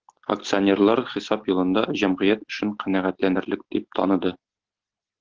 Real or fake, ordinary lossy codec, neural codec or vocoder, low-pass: real; Opus, 16 kbps; none; 7.2 kHz